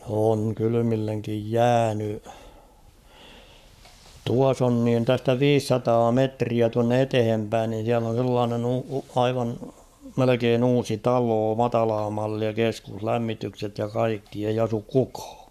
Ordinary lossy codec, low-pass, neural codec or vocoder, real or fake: none; 14.4 kHz; codec, 44.1 kHz, 7.8 kbps, Pupu-Codec; fake